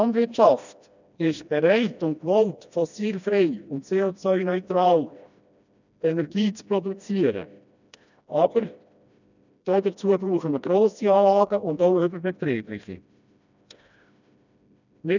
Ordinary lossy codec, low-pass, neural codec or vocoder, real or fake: none; 7.2 kHz; codec, 16 kHz, 1 kbps, FreqCodec, smaller model; fake